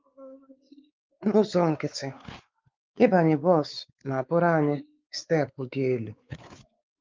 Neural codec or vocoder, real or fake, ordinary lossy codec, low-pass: codec, 16 kHz, 4 kbps, X-Codec, WavLM features, trained on Multilingual LibriSpeech; fake; Opus, 24 kbps; 7.2 kHz